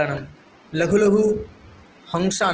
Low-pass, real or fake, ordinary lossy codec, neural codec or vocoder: 7.2 kHz; real; Opus, 16 kbps; none